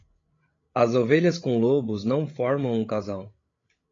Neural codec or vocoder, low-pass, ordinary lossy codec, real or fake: codec, 16 kHz, 16 kbps, FreqCodec, larger model; 7.2 kHz; AAC, 32 kbps; fake